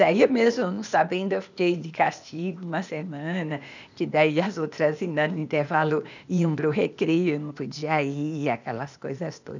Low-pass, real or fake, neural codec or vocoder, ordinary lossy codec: 7.2 kHz; fake; codec, 16 kHz, 0.8 kbps, ZipCodec; none